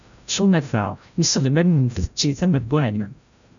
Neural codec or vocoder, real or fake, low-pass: codec, 16 kHz, 0.5 kbps, FreqCodec, larger model; fake; 7.2 kHz